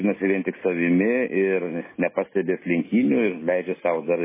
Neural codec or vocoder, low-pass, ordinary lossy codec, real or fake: none; 3.6 kHz; MP3, 16 kbps; real